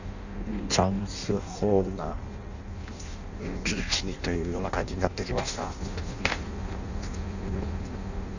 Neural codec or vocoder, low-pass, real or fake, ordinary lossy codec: codec, 16 kHz in and 24 kHz out, 0.6 kbps, FireRedTTS-2 codec; 7.2 kHz; fake; none